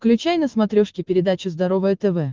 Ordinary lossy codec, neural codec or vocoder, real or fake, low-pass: Opus, 32 kbps; none; real; 7.2 kHz